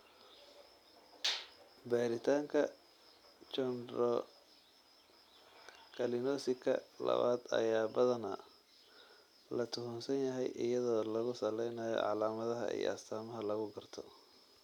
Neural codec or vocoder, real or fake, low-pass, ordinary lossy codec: none; real; 19.8 kHz; none